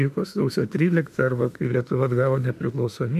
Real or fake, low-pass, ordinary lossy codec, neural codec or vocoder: fake; 14.4 kHz; MP3, 96 kbps; autoencoder, 48 kHz, 32 numbers a frame, DAC-VAE, trained on Japanese speech